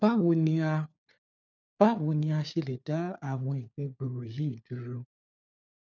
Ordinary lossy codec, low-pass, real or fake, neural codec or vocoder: none; 7.2 kHz; fake; codec, 16 kHz, 4 kbps, FunCodec, trained on LibriTTS, 50 frames a second